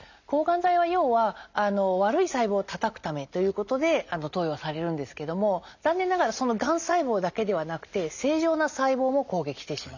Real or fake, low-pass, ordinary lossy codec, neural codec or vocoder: real; 7.2 kHz; none; none